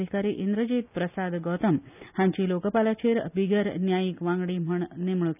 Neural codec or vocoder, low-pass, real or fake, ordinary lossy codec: none; 3.6 kHz; real; none